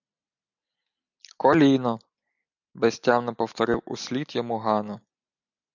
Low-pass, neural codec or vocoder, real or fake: 7.2 kHz; none; real